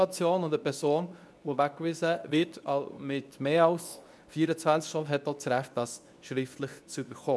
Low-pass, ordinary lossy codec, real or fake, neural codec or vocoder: none; none; fake; codec, 24 kHz, 0.9 kbps, WavTokenizer, medium speech release version 2